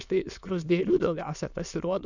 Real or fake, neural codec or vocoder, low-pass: fake; autoencoder, 22.05 kHz, a latent of 192 numbers a frame, VITS, trained on many speakers; 7.2 kHz